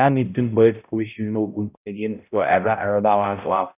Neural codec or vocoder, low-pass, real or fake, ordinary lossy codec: codec, 16 kHz, 0.5 kbps, X-Codec, HuBERT features, trained on balanced general audio; 3.6 kHz; fake; none